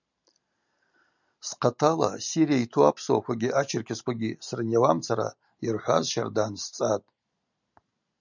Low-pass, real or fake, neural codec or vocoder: 7.2 kHz; real; none